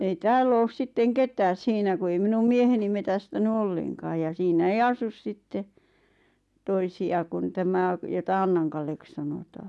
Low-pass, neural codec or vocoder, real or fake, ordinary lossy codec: none; none; real; none